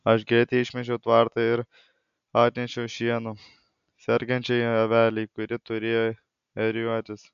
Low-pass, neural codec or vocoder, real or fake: 7.2 kHz; none; real